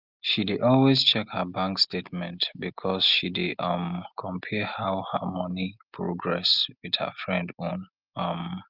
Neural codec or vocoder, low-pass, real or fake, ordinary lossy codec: none; 5.4 kHz; real; Opus, 24 kbps